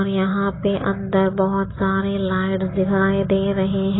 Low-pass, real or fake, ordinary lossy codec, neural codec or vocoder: 7.2 kHz; real; AAC, 16 kbps; none